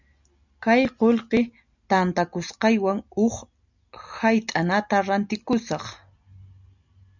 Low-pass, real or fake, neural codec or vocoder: 7.2 kHz; real; none